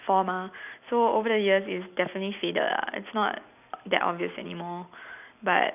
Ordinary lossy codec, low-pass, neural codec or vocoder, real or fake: none; 3.6 kHz; none; real